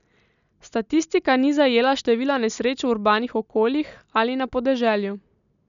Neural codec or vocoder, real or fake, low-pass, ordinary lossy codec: none; real; 7.2 kHz; none